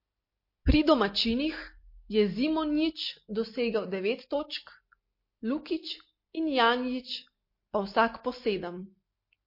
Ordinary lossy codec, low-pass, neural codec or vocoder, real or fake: MP3, 32 kbps; 5.4 kHz; none; real